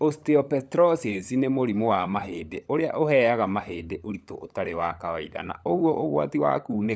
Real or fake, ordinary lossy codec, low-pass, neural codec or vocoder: fake; none; none; codec, 16 kHz, 16 kbps, FunCodec, trained on LibriTTS, 50 frames a second